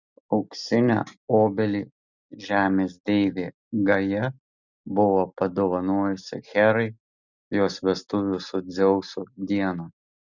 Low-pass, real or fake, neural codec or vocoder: 7.2 kHz; real; none